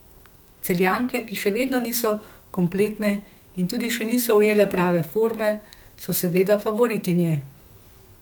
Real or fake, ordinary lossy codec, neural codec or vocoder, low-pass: fake; none; codec, 44.1 kHz, 2.6 kbps, SNAC; none